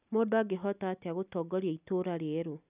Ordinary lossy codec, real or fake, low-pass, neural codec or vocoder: none; real; 3.6 kHz; none